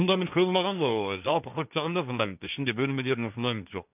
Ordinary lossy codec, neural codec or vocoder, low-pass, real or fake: none; codec, 16 kHz, 1.1 kbps, Voila-Tokenizer; 3.6 kHz; fake